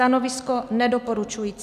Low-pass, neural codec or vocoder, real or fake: 14.4 kHz; none; real